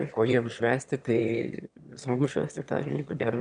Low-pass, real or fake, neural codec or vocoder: 9.9 kHz; fake; autoencoder, 22.05 kHz, a latent of 192 numbers a frame, VITS, trained on one speaker